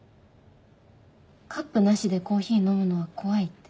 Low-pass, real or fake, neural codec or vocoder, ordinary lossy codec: none; real; none; none